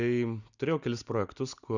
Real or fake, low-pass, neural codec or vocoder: real; 7.2 kHz; none